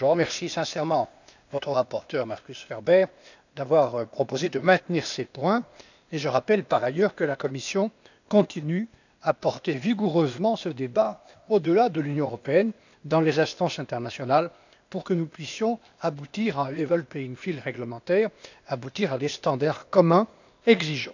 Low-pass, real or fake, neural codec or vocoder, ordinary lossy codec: 7.2 kHz; fake; codec, 16 kHz, 0.8 kbps, ZipCodec; none